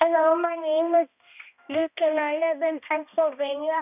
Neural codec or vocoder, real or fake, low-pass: codec, 16 kHz, 1 kbps, X-Codec, HuBERT features, trained on balanced general audio; fake; 3.6 kHz